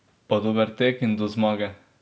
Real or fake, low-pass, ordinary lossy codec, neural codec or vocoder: real; none; none; none